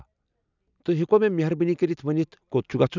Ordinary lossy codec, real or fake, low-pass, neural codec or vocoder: none; real; 7.2 kHz; none